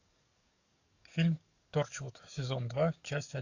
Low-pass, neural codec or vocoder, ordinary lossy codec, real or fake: 7.2 kHz; codec, 44.1 kHz, 7.8 kbps, DAC; AAC, 48 kbps; fake